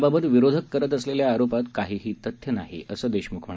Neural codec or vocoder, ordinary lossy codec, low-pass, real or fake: none; none; none; real